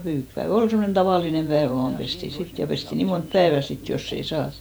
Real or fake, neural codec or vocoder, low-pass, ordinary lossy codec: fake; vocoder, 48 kHz, 128 mel bands, Vocos; none; none